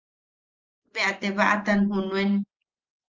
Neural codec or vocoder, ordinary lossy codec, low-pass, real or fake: none; Opus, 24 kbps; 7.2 kHz; real